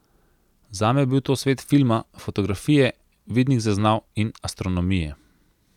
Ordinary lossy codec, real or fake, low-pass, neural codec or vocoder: none; real; 19.8 kHz; none